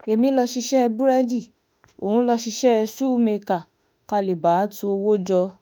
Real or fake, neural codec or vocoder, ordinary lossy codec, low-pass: fake; autoencoder, 48 kHz, 32 numbers a frame, DAC-VAE, trained on Japanese speech; none; none